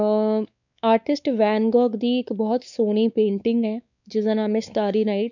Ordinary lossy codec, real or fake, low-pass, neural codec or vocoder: none; fake; 7.2 kHz; codec, 16 kHz, 4 kbps, X-Codec, WavLM features, trained on Multilingual LibriSpeech